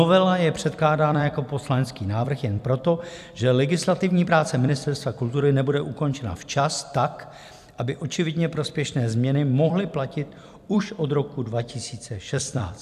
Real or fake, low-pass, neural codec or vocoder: fake; 14.4 kHz; vocoder, 44.1 kHz, 128 mel bands every 256 samples, BigVGAN v2